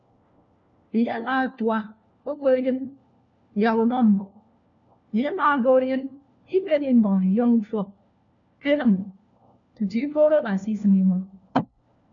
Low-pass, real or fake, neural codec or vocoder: 7.2 kHz; fake; codec, 16 kHz, 1 kbps, FunCodec, trained on LibriTTS, 50 frames a second